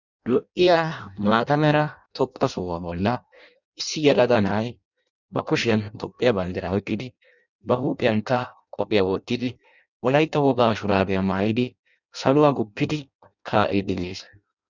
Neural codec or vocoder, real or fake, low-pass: codec, 16 kHz in and 24 kHz out, 0.6 kbps, FireRedTTS-2 codec; fake; 7.2 kHz